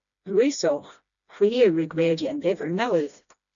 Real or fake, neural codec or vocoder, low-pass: fake; codec, 16 kHz, 1 kbps, FreqCodec, smaller model; 7.2 kHz